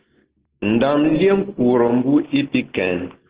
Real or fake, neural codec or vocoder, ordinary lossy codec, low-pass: real; none; Opus, 16 kbps; 3.6 kHz